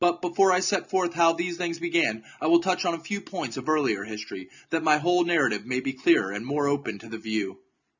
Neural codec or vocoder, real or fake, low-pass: none; real; 7.2 kHz